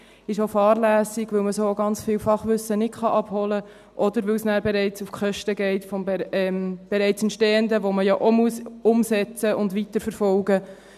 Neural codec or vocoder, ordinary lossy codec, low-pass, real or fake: none; none; 14.4 kHz; real